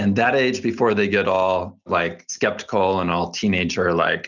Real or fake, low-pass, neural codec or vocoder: real; 7.2 kHz; none